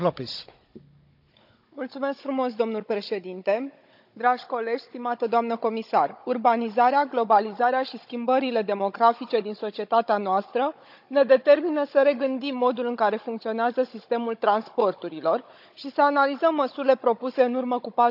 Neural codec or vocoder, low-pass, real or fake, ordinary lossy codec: codec, 16 kHz, 16 kbps, FunCodec, trained on Chinese and English, 50 frames a second; 5.4 kHz; fake; none